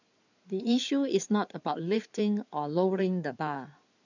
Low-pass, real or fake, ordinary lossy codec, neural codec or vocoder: 7.2 kHz; fake; none; codec, 16 kHz in and 24 kHz out, 2.2 kbps, FireRedTTS-2 codec